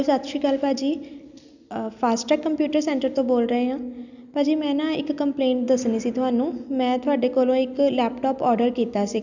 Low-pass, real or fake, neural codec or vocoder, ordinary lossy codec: 7.2 kHz; real; none; none